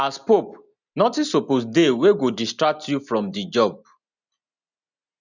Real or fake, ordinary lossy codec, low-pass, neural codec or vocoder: real; none; 7.2 kHz; none